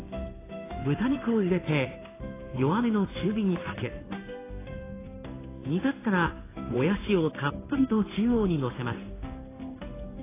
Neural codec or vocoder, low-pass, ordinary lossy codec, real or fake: codec, 16 kHz in and 24 kHz out, 1 kbps, XY-Tokenizer; 3.6 kHz; AAC, 16 kbps; fake